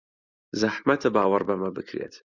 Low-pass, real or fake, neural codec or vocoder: 7.2 kHz; real; none